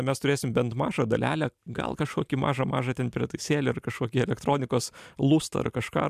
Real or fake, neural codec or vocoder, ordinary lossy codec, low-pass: real; none; MP3, 96 kbps; 14.4 kHz